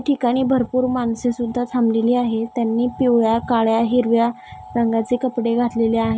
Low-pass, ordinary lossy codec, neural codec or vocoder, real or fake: none; none; none; real